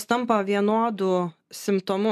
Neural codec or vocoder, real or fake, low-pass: none; real; 14.4 kHz